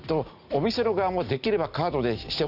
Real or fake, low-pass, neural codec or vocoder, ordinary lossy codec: real; 5.4 kHz; none; none